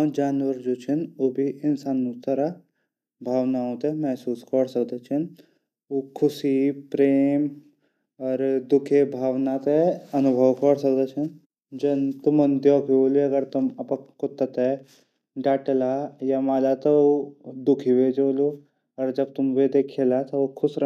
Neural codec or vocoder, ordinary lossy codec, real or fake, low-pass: none; none; real; 14.4 kHz